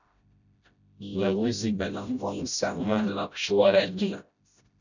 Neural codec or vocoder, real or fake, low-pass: codec, 16 kHz, 0.5 kbps, FreqCodec, smaller model; fake; 7.2 kHz